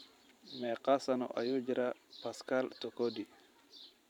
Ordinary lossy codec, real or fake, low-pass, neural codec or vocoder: none; fake; 19.8 kHz; vocoder, 44.1 kHz, 128 mel bands every 512 samples, BigVGAN v2